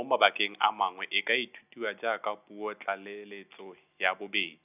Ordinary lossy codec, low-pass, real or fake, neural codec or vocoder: none; 3.6 kHz; real; none